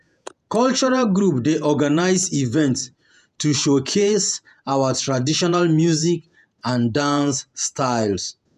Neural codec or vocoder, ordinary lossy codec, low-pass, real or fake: vocoder, 48 kHz, 128 mel bands, Vocos; none; 14.4 kHz; fake